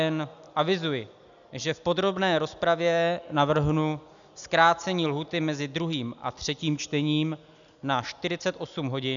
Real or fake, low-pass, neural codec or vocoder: real; 7.2 kHz; none